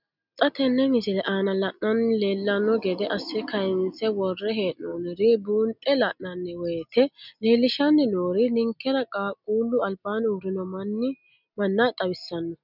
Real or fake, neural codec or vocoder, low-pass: real; none; 5.4 kHz